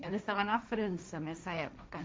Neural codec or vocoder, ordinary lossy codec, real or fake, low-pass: codec, 16 kHz, 1.1 kbps, Voila-Tokenizer; none; fake; none